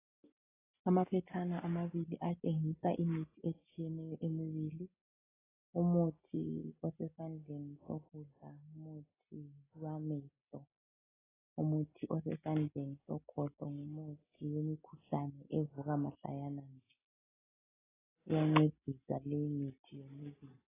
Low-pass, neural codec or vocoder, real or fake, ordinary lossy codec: 3.6 kHz; none; real; AAC, 16 kbps